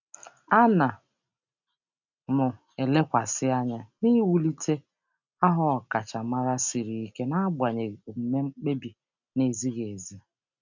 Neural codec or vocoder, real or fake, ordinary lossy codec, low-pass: none; real; none; 7.2 kHz